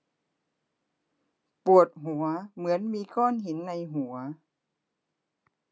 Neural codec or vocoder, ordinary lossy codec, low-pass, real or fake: none; none; none; real